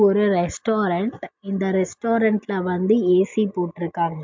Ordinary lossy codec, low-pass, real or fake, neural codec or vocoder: MP3, 64 kbps; 7.2 kHz; real; none